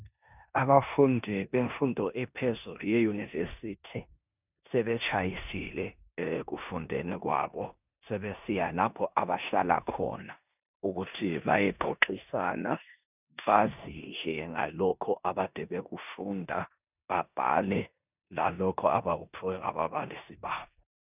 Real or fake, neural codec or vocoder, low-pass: fake; codec, 16 kHz in and 24 kHz out, 0.9 kbps, LongCat-Audio-Codec, four codebook decoder; 3.6 kHz